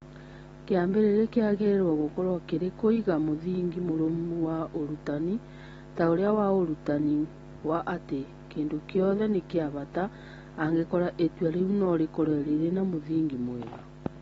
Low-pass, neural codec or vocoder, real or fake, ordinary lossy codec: 9.9 kHz; none; real; AAC, 24 kbps